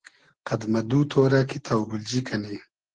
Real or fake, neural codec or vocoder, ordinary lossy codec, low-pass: real; none; Opus, 16 kbps; 9.9 kHz